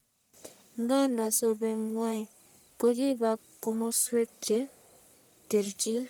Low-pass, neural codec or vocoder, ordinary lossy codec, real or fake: none; codec, 44.1 kHz, 1.7 kbps, Pupu-Codec; none; fake